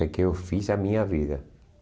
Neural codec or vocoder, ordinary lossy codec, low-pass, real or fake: none; none; none; real